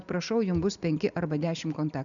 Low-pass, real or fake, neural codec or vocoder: 7.2 kHz; real; none